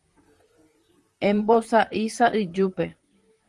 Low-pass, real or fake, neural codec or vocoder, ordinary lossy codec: 10.8 kHz; fake; vocoder, 44.1 kHz, 128 mel bands, Pupu-Vocoder; Opus, 24 kbps